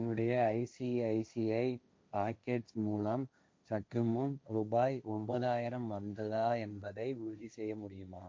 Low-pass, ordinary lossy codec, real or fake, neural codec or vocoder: none; none; fake; codec, 16 kHz, 1.1 kbps, Voila-Tokenizer